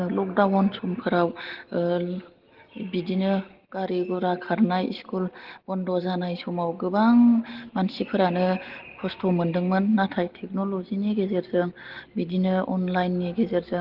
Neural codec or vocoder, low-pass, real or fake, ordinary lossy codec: none; 5.4 kHz; real; Opus, 16 kbps